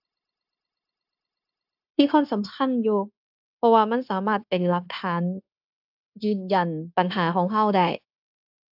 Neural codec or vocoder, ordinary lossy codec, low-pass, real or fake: codec, 16 kHz, 0.9 kbps, LongCat-Audio-Codec; AAC, 48 kbps; 5.4 kHz; fake